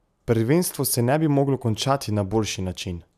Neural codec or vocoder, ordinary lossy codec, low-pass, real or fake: none; none; 14.4 kHz; real